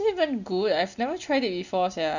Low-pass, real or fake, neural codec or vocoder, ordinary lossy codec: 7.2 kHz; real; none; none